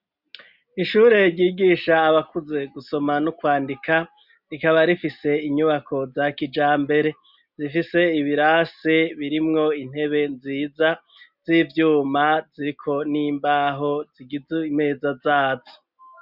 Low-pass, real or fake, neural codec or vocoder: 5.4 kHz; real; none